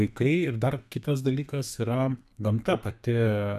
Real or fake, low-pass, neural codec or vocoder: fake; 14.4 kHz; codec, 32 kHz, 1.9 kbps, SNAC